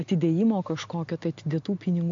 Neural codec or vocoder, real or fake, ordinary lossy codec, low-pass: none; real; MP3, 48 kbps; 7.2 kHz